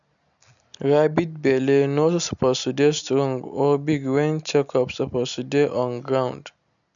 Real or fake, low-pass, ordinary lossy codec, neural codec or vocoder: real; 7.2 kHz; none; none